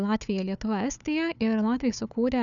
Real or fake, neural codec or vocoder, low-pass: fake; codec, 16 kHz, 4 kbps, FunCodec, trained on Chinese and English, 50 frames a second; 7.2 kHz